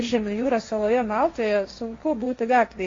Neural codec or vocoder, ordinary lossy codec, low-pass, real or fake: codec, 16 kHz, 1.1 kbps, Voila-Tokenizer; AAC, 32 kbps; 7.2 kHz; fake